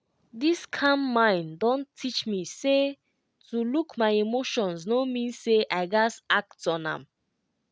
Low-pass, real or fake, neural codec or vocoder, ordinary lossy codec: none; real; none; none